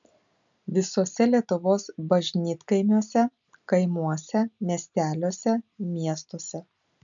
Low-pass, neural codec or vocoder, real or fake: 7.2 kHz; none; real